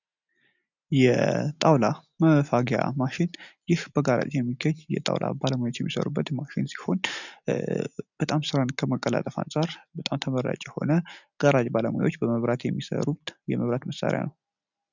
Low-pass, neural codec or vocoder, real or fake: 7.2 kHz; none; real